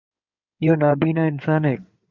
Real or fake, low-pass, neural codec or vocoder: fake; 7.2 kHz; codec, 16 kHz in and 24 kHz out, 2.2 kbps, FireRedTTS-2 codec